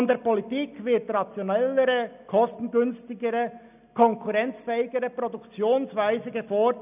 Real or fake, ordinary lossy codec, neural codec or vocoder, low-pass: real; none; none; 3.6 kHz